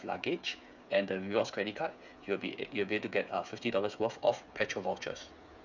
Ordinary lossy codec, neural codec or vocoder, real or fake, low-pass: none; codec, 16 kHz, 8 kbps, FreqCodec, smaller model; fake; 7.2 kHz